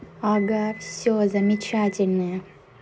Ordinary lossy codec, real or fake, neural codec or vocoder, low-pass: none; real; none; none